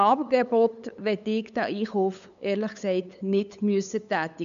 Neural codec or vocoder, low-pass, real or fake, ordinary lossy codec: codec, 16 kHz, 8 kbps, FunCodec, trained on LibriTTS, 25 frames a second; 7.2 kHz; fake; none